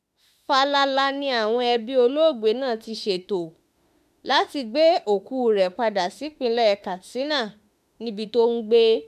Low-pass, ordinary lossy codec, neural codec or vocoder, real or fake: 14.4 kHz; MP3, 96 kbps; autoencoder, 48 kHz, 32 numbers a frame, DAC-VAE, trained on Japanese speech; fake